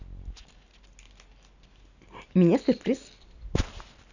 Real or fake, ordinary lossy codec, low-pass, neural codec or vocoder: real; none; 7.2 kHz; none